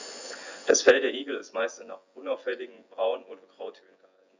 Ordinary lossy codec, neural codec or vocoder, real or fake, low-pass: Opus, 64 kbps; vocoder, 24 kHz, 100 mel bands, Vocos; fake; 7.2 kHz